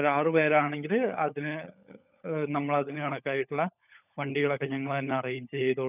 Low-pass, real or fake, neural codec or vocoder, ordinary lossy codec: 3.6 kHz; fake; codec, 16 kHz, 16 kbps, FunCodec, trained on LibriTTS, 50 frames a second; none